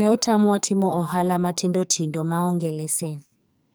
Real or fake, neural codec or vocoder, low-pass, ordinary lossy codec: fake; codec, 44.1 kHz, 2.6 kbps, SNAC; none; none